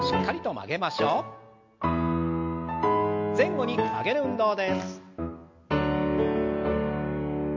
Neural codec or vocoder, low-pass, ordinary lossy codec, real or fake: none; 7.2 kHz; MP3, 48 kbps; real